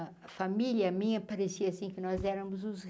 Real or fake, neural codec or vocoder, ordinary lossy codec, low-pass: real; none; none; none